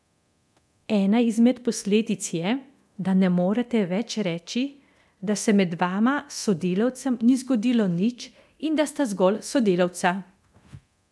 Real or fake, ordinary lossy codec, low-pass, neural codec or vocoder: fake; none; none; codec, 24 kHz, 0.9 kbps, DualCodec